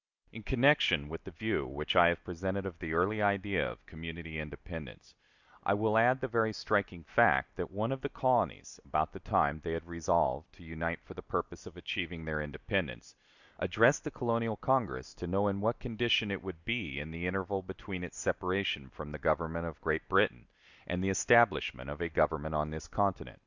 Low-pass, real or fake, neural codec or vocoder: 7.2 kHz; real; none